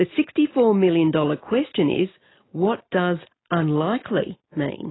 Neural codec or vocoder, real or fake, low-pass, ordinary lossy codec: none; real; 7.2 kHz; AAC, 16 kbps